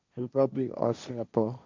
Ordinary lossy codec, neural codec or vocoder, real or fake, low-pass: MP3, 64 kbps; codec, 16 kHz, 1.1 kbps, Voila-Tokenizer; fake; 7.2 kHz